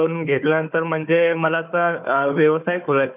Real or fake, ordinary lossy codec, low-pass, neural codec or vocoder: fake; none; 3.6 kHz; codec, 16 kHz, 4 kbps, FunCodec, trained on Chinese and English, 50 frames a second